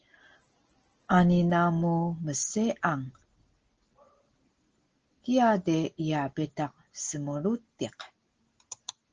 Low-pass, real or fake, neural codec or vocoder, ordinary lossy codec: 7.2 kHz; real; none; Opus, 16 kbps